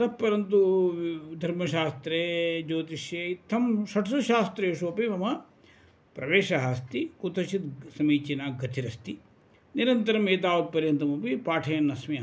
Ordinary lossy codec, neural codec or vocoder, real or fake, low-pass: none; none; real; none